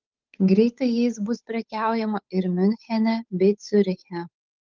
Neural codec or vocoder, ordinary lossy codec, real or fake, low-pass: codec, 16 kHz, 8 kbps, FunCodec, trained on Chinese and English, 25 frames a second; Opus, 24 kbps; fake; 7.2 kHz